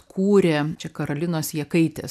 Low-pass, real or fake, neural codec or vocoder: 14.4 kHz; real; none